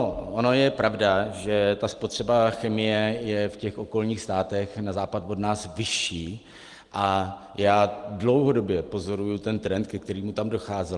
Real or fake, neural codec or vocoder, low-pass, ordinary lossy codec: real; none; 10.8 kHz; Opus, 24 kbps